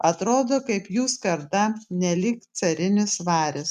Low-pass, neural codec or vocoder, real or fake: 14.4 kHz; none; real